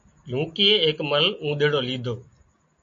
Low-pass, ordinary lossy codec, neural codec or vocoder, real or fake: 7.2 kHz; MP3, 48 kbps; none; real